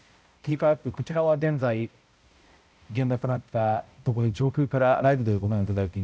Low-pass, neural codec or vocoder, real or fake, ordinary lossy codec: none; codec, 16 kHz, 0.5 kbps, X-Codec, HuBERT features, trained on balanced general audio; fake; none